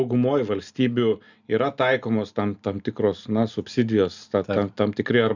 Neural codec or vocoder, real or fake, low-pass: none; real; 7.2 kHz